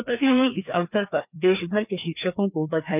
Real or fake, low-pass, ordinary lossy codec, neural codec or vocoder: fake; 3.6 kHz; none; codec, 16 kHz, 2 kbps, FreqCodec, larger model